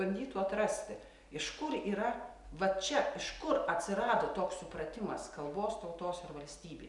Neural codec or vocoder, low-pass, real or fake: none; 10.8 kHz; real